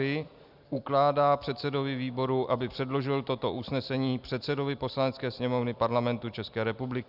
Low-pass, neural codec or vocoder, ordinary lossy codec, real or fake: 5.4 kHz; none; Opus, 64 kbps; real